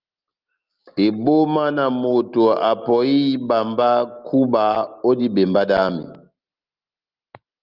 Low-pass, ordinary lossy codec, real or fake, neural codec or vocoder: 5.4 kHz; Opus, 32 kbps; real; none